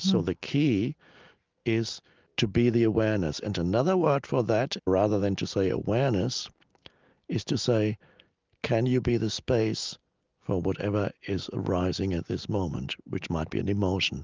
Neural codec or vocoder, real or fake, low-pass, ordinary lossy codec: none; real; 7.2 kHz; Opus, 24 kbps